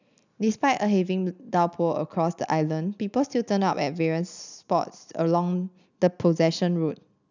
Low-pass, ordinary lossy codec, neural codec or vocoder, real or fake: 7.2 kHz; none; none; real